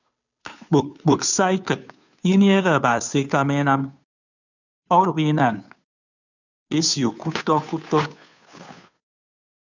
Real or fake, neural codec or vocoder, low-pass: fake; codec, 16 kHz, 2 kbps, FunCodec, trained on Chinese and English, 25 frames a second; 7.2 kHz